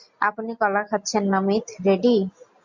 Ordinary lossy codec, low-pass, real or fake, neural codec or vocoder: MP3, 64 kbps; 7.2 kHz; real; none